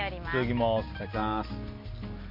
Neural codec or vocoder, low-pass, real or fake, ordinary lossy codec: none; 5.4 kHz; real; MP3, 32 kbps